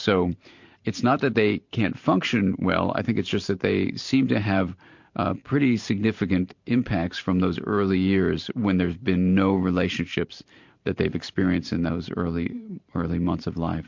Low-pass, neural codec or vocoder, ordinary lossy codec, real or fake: 7.2 kHz; vocoder, 44.1 kHz, 128 mel bands every 512 samples, BigVGAN v2; MP3, 48 kbps; fake